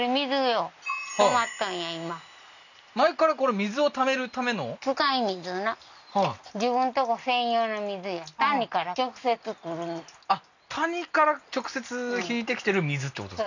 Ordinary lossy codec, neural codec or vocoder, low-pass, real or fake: none; none; 7.2 kHz; real